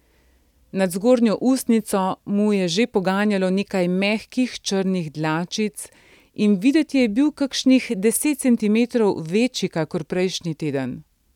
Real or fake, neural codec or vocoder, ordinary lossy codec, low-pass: real; none; none; 19.8 kHz